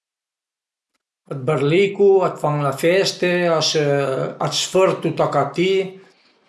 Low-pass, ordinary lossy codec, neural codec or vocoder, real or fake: none; none; none; real